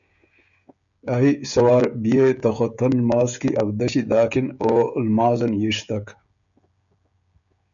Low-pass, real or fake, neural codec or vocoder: 7.2 kHz; fake; codec, 16 kHz, 16 kbps, FreqCodec, smaller model